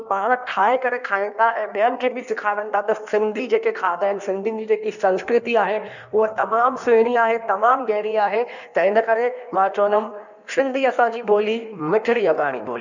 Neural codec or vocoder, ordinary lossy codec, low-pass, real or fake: codec, 16 kHz in and 24 kHz out, 1.1 kbps, FireRedTTS-2 codec; none; 7.2 kHz; fake